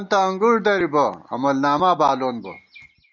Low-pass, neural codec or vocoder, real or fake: 7.2 kHz; none; real